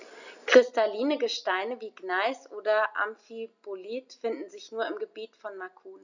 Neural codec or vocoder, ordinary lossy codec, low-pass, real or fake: none; none; 7.2 kHz; real